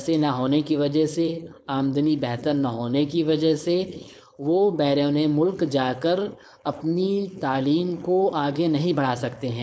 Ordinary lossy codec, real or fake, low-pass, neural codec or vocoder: none; fake; none; codec, 16 kHz, 4.8 kbps, FACodec